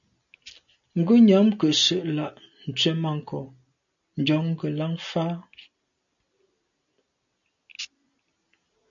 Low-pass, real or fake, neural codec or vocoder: 7.2 kHz; real; none